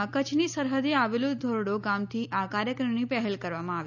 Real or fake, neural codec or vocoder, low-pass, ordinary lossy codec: real; none; 7.2 kHz; none